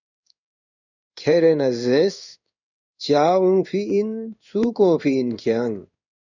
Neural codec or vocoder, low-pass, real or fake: codec, 16 kHz in and 24 kHz out, 1 kbps, XY-Tokenizer; 7.2 kHz; fake